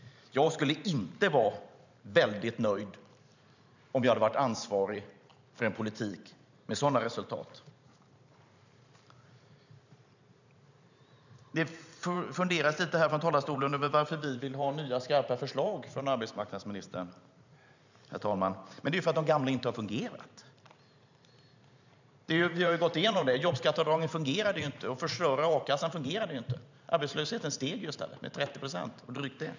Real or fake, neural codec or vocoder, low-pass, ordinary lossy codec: fake; vocoder, 44.1 kHz, 128 mel bands every 512 samples, BigVGAN v2; 7.2 kHz; none